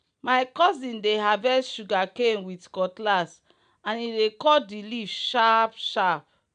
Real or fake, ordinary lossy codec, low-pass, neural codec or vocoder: real; none; 9.9 kHz; none